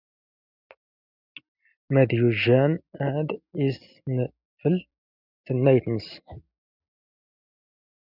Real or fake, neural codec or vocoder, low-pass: real; none; 5.4 kHz